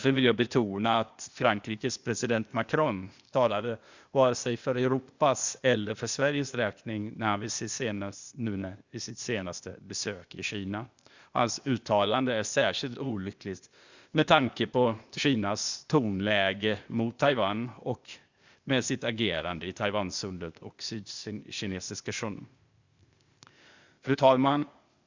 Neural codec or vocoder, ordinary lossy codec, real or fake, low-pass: codec, 16 kHz, 0.8 kbps, ZipCodec; Opus, 64 kbps; fake; 7.2 kHz